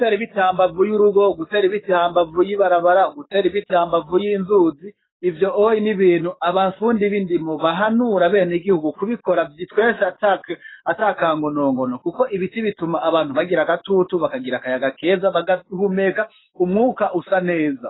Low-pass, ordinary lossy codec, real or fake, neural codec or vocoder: 7.2 kHz; AAC, 16 kbps; fake; codec, 44.1 kHz, 7.8 kbps, DAC